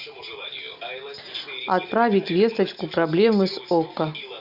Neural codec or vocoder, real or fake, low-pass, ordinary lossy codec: none; real; 5.4 kHz; Opus, 64 kbps